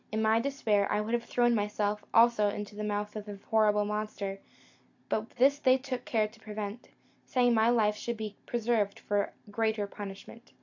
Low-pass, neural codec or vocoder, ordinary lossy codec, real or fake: 7.2 kHz; none; AAC, 48 kbps; real